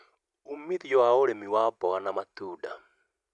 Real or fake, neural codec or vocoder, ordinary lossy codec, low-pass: real; none; none; 10.8 kHz